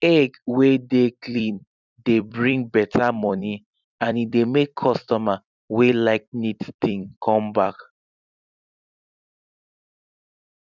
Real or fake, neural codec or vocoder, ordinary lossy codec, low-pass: fake; vocoder, 44.1 kHz, 128 mel bands every 256 samples, BigVGAN v2; none; 7.2 kHz